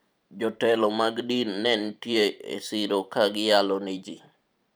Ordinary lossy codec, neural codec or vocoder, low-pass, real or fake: none; vocoder, 44.1 kHz, 128 mel bands every 512 samples, BigVGAN v2; none; fake